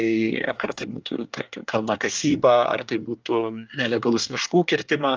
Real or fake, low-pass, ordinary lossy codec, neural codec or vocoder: fake; 7.2 kHz; Opus, 24 kbps; codec, 24 kHz, 1 kbps, SNAC